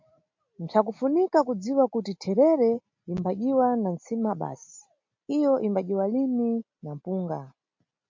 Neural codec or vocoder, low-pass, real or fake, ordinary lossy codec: none; 7.2 kHz; real; MP3, 48 kbps